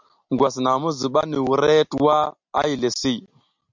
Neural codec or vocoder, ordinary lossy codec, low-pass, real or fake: none; MP3, 64 kbps; 7.2 kHz; real